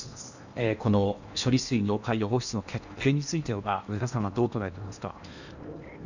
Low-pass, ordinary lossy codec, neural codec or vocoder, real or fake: 7.2 kHz; none; codec, 16 kHz in and 24 kHz out, 0.8 kbps, FocalCodec, streaming, 65536 codes; fake